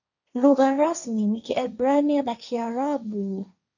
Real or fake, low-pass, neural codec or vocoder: fake; 7.2 kHz; codec, 16 kHz, 1.1 kbps, Voila-Tokenizer